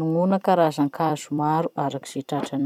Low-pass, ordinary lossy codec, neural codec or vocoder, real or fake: 19.8 kHz; none; vocoder, 44.1 kHz, 128 mel bands every 256 samples, BigVGAN v2; fake